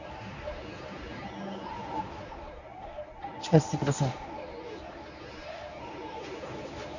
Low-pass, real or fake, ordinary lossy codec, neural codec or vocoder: 7.2 kHz; fake; none; codec, 24 kHz, 0.9 kbps, WavTokenizer, medium speech release version 1